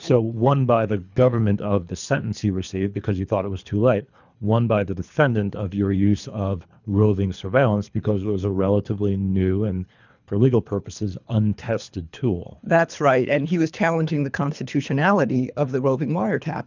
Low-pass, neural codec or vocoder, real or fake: 7.2 kHz; codec, 24 kHz, 3 kbps, HILCodec; fake